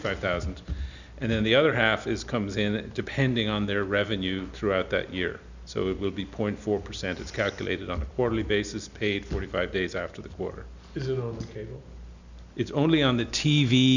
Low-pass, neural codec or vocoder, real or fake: 7.2 kHz; none; real